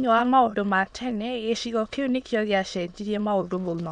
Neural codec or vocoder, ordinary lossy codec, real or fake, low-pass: autoencoder, 22.05 kHz, a latent of 192 numbers a frame, VITS, trained on many speakers; none; fake; 9.9 kHz